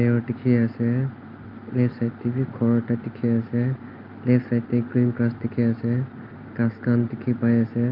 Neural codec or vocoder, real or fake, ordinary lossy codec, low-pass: none; real; Opus, 24 kbps; 5.4 kHz